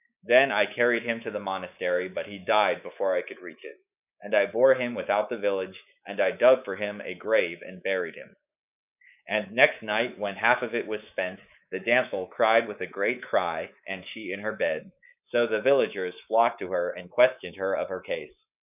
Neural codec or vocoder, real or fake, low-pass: codec, 24 kHz, 3.1 kbps, DualCodec; fake; 3.6 kHz